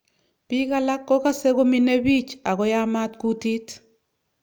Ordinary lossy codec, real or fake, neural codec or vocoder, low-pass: none; real; none; none